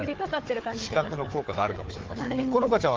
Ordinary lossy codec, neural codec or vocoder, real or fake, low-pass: Opus, 16 kbps; codec, 16 kHz, 4 kbps, FunCodec, trained on Chinese and English, 50 frames a second; fake; 7.2 kHz